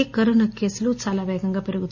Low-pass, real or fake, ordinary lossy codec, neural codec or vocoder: 7.2 kHz; real; none; none